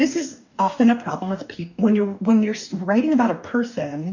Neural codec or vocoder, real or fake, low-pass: codec, 44.1 kHz, 2.6 kbps, DAC; fake; 7.2 kHz